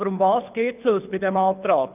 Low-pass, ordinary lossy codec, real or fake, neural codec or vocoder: 3.6 kHz; none; fake; codec, 24 kHz, 6 kbps, HILCodec